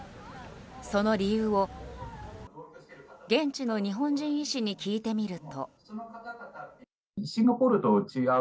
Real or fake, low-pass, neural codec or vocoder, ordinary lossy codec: real; none; none; none